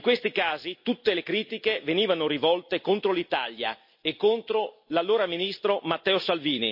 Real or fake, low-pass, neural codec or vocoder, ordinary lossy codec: real; 5.4 kHz; none; MP3, 32 kbps